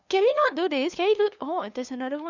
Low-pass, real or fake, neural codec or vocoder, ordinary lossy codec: 7.2 kHz; fake; codec, 16 kHz, 2 kbps, FunCodec, trained on LibriTTS, 25 frames a second; none